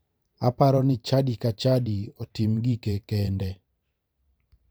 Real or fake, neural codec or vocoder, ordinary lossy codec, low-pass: fake; vocoder, 44.1 kHz, 128 mel bands every 512 samples, BigVGAN v2; none; none